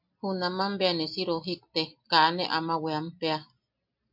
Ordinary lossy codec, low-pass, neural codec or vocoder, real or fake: MP3, 48 kbps; 5.4 kHz; none; real